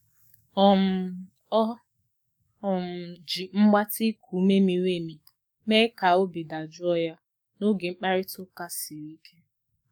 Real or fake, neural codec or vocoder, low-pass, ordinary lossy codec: fake; codec, 44.1 kHz, 7.8 kbps, DAC; 19.8 kHz; none